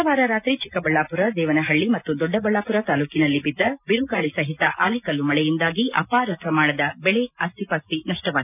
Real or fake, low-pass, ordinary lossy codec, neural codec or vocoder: real; 3.6 kHz; none; none